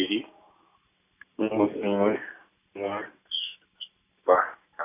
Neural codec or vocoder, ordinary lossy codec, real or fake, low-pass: none; AAC, 24 kbps; real; 3.6 kHz